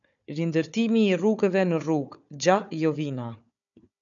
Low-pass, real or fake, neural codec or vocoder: 7.2 kHz; fake; codec, 16 kHz, 4 kbps, FunCodec, trained on Chinese and English, 50 frames a second